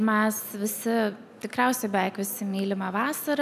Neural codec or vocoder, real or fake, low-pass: none; real; 14.4 kHz